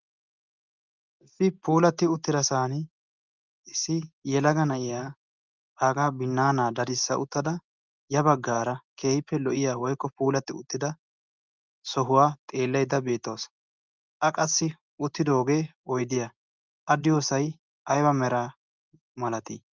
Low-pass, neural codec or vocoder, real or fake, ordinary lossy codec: 7.2 kHz; none; real; Opus, 24 kbps